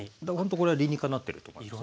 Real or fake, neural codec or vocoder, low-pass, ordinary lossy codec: real; none; none; none